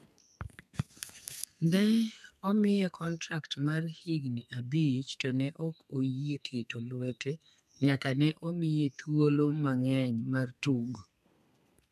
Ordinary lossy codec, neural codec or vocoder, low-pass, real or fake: none; codec, 32 kHz, 1.9 kbps, SNAC; 14.4 kHz; fake